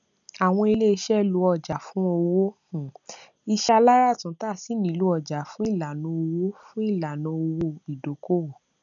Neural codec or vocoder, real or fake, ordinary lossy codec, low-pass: none; real; none; 7.2 kHz